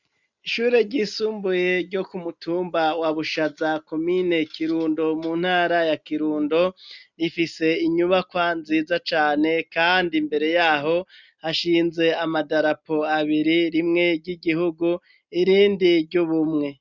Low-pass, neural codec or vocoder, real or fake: 7.2 kHz; none; real